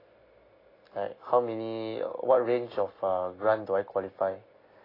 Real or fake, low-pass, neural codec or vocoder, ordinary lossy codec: real; 5.4 kHz; none; AAC, 24 kbps